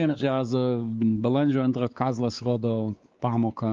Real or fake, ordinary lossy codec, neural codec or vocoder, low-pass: fake; Opus, 32 kbps; codec, 16 kHz, 4 kbps, X-Codec, WavLM features, trained on Multilingual LibriSpeech; 7.2 kHz